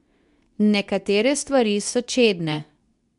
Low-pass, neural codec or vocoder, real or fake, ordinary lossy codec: 10.8 kHz; codec, 24 kHz, 0.9 kbps, WavTokenizer, medium speech release version 2; fake; none